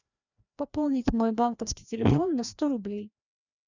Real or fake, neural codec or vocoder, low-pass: fake; codec, 16 kHz, 1 kbps, FreqCodec, larger model; 7.2 kHz